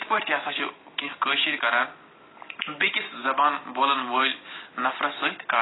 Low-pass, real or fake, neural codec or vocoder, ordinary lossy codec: 7.2 kHz; real; none; AAC, 16 kbps